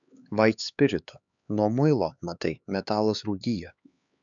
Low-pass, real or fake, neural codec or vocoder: 7.2 kHz; fake; codec, 16 kHz, 4 kbps, X-Codec, HuBERT features, trained on LibriSpeech